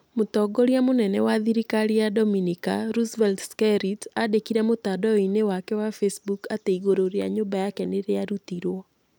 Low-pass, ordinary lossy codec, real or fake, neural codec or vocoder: none; none; real; none